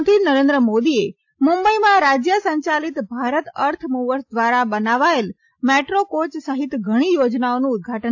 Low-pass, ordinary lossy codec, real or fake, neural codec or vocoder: 7.2 kHz; AAC, 48 kbps; real; none